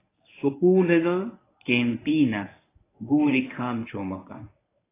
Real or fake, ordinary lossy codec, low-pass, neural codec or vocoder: fake; AAC, 16 kbps; 3.6 kHz; codec, 24 kHz, 0.9 kbps, WavTokenizer, medium speech release version 1